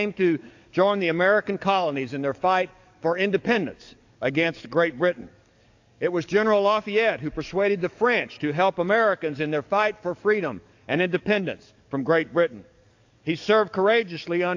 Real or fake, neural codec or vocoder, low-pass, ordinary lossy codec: fake; codec, 44.1 kHz, 7.8 kbps, DAC; 7.2 kHz; AAC, 48 kbps